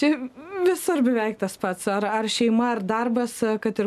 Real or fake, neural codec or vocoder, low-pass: real; none; 14.4 kHz